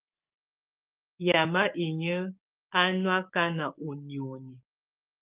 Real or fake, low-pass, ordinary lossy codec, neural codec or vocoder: fake; 3.6 kHz; Opus, 24 kbps; codec, 16 kHz, 6 kbps, DAC